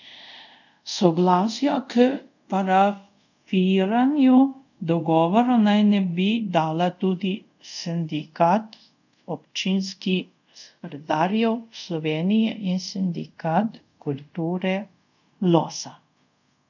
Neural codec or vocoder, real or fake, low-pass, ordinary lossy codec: codec, 24 kHz, 0.5 kbps, DualCodec; fake; 7.2 kHz; none